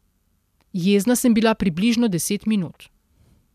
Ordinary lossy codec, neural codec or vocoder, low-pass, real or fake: MP3, 96 kbps; none; 14.4 kHz; real